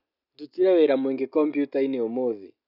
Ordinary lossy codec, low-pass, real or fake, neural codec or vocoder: none; 5.4 kHz; real; none